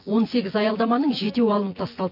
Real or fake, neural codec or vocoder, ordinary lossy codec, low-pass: fake; vocoder, 24 kHz, 100 mel bands, Vocos; MP3, 32 kbps; 5.4 kHz